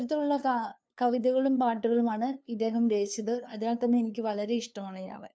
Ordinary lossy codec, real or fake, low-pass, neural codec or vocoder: none; fake; none; codec, 16 kHz, 2 kbps, FunCodec, trained on LibriTTS, 25 frames a second